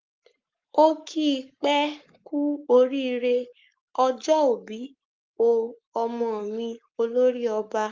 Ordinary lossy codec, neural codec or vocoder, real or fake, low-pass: Opus, 32 kbps; codec, 44.1 kHz, 7.8 kbps, Pupu-Codec; fake; 7.2 kHz